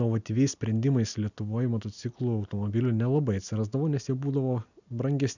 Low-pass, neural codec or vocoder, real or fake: 7.2 kHz; none; real